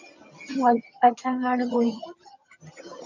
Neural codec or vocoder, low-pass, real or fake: vocoder, 22.05 kHz, 80 mel bands, HiFi-GAN; 7.2 kHz; fake